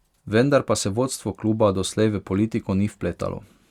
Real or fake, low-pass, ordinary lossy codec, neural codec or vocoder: real; 19.8 kHz; Opus, 64 kbps; none